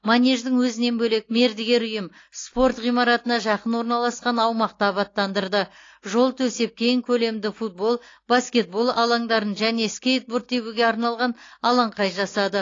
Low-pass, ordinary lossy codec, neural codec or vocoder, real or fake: 7.2 kHz; AAC, 32 kbps; none; real